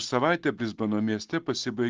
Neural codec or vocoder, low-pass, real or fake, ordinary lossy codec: none; 7.2 kHz; real; Opus, 16 kbps